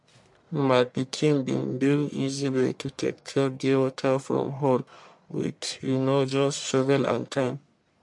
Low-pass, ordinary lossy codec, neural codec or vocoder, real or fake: 10.8 kHz; MP3, 64 kbps; codec, 44.1 kHz, 1.7 kbps, Pupu-Codec; fake